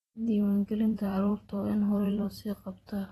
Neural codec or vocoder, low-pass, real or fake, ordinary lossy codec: vocoder, 44.1 kHz, 128 mel bands every 512 samples, BigVGAN v2; 19.8 kHz; fake; AAC, 32 kbps